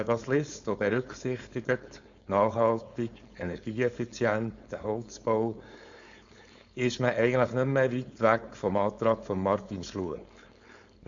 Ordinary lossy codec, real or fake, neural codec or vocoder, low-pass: AAC, 64 kbps; fake; codec, 16 kHz, 4.8 kbps, FACodec; 7.2 kHz